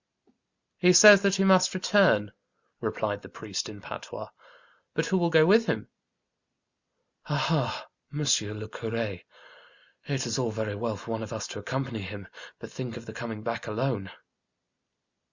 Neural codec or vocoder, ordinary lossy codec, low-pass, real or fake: none; Opus, 64 kbps; 7.2 kHz; real